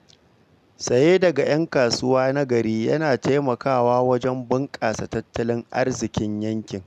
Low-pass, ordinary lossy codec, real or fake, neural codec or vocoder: 14.4 kHz; none; real; none